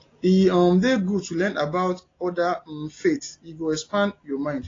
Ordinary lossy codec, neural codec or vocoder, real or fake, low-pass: AAC, 32 kbps; none; real; 7.2 kHz